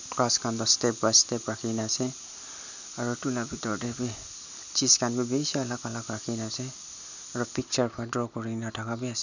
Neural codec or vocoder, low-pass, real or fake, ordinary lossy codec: none; 7.2 kHz; real; none